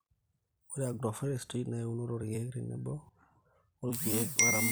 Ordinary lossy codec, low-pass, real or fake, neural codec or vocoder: none; none; fake; vocoder, 44.1 kHz, 128 mel bands every 256 samples, BigVGAN v2